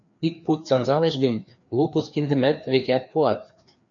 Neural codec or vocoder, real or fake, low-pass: codec, 16 kHz, 2 kbps, FreqCodec, larger model; fake; 7.2 kHz